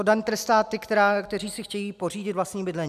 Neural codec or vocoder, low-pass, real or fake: none; 14.4 kHz; real